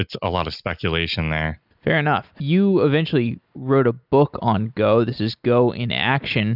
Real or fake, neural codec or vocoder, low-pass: real; none; 5.4 kHz